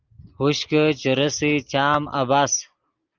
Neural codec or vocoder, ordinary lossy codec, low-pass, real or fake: none; Opus, 32 kbps; 7.2 kHz; real